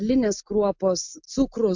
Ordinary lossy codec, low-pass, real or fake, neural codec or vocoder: MP3, 64 kbps; 7.2 kHz; real; none